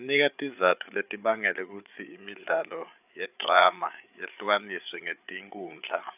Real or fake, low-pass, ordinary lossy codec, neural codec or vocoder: fake; 3.6 kHz; none; codec, 16 kHz, 8 kbps, FreqCodec, larger model